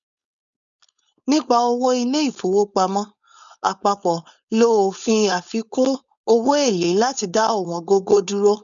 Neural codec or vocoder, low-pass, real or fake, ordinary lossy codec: codec, 16 kHz, 4.8 kbps, FACodec; 7.2 kHz; fake; none